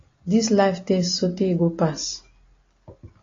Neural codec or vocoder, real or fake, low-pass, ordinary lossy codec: none; real; 7.2 kHz; AAC, 32 kbps